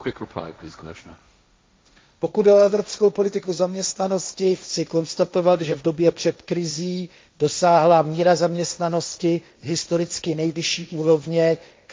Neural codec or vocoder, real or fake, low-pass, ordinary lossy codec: codec, 16 kHz, 1.1 kbps, Voila-Tokenizer; fake; none; none